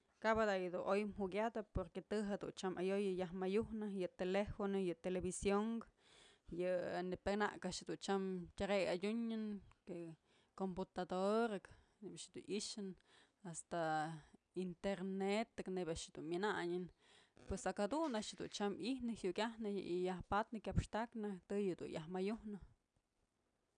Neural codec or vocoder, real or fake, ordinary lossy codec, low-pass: none; real; none; 9.9 kHz